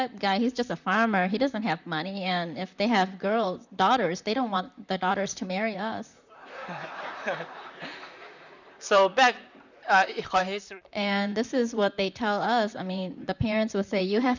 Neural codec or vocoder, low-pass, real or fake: vocoder, 44.1 kHz, 128 mel bands, Pupu-Vocoder; 7.2 kHz; fake